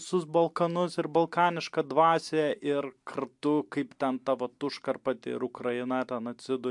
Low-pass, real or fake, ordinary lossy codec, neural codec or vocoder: 10.8 kHz; real; MP3, 64 kbps; none